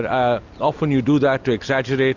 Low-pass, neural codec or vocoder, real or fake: 7.2 kHz; none; real